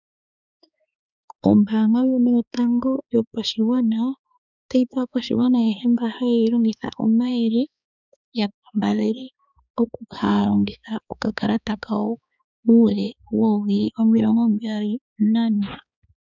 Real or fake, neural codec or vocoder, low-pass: fake; codec, 16 kHz, 4 kbps, X-Codec, HuBERT features, trained on balanced general audio; 7.2 kHz